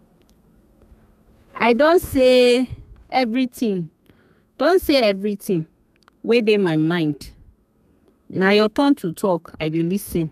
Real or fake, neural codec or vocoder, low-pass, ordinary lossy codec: fake; codec, 32 kHz, 1.9 kbps, SNAC; 14.4 kHz; none